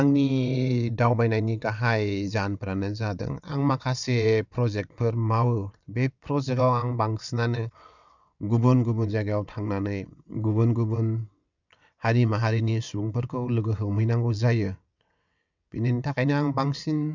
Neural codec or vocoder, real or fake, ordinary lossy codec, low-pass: vocoder, 22.05 kHz, 80 mel bands, WaveNeXt; fake; none; 7.2 kHz